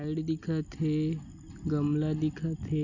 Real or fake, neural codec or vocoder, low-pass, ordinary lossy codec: real; none; 7.2 kHz; none